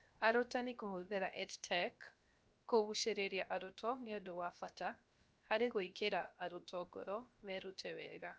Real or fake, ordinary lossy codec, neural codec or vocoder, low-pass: fake; none; codec, 16 kHz, 0.7 kbps, FocalCodec; none